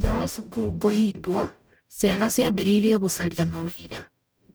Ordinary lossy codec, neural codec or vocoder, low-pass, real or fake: none; codec, 44.1 kHz, 0.9 kbps, DAC; none; fake